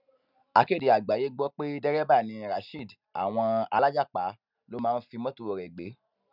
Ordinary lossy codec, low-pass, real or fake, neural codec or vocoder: none; 5.4 kHz; real; none